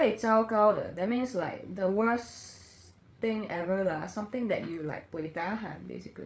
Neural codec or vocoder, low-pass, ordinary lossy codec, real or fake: codec, 16 kHz, 8 kbps, FreqCodec, larger model; none; none; fake